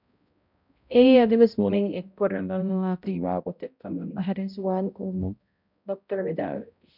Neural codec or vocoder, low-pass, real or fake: codec, 16 kHz, 0.5 kbps, X-Codec, HuBERT features, trained on balanced general audio; 5.4 kHz; fake